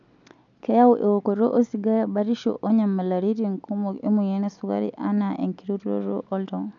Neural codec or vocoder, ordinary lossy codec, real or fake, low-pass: none; none; real; 7.2 kHz